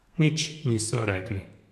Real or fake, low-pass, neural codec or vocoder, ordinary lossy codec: fake; 14.4 kHz; codec, 32 kHz, 1.9 kbps, SNAC; none